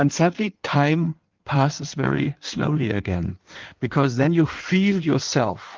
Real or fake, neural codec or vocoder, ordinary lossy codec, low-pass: fake; codec, 16 kHz in and 24 kHz out, 1.1 kbps, FireRedTTS-2 codec; Opus, 24 kbps; 7.2 kHz